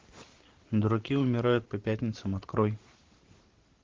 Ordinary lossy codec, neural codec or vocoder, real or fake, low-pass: Opus, 16 kbps; vocoder, 44.1 kHz, 128 mel bands every 512 samples, BigVGAN v2; fake; 7.2 kHz